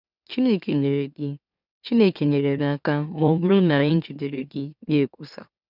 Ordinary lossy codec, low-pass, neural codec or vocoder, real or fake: none; 5.4 kHz; autoencoder, 44.1 kHz, a latent of 192 numbers a frame, MeloTTS; fake